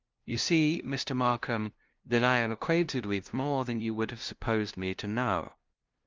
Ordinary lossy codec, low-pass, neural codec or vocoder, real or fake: Opus, 24 kbps; 7.2 kHz; codec, 16 kHz, 0.5 kbps, FunCodec, trained on LibriTTS, 25 frames a second; fake